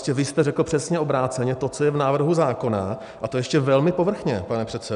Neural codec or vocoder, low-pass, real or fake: none; 10.8 kHz; real